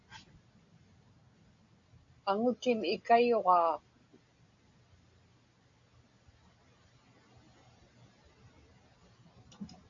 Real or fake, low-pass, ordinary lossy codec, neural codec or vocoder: real; 7.2 kHz; AAC, 64 kbps; none